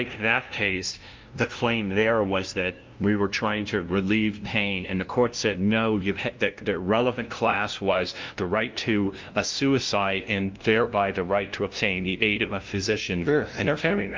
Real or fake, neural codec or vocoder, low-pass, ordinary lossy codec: fake; codec, 16 kHz, 0.5 kbps, FunCodec, trained on LibriTTS, 25 frames a second; 7.2 kHz; Opus, 24 kbps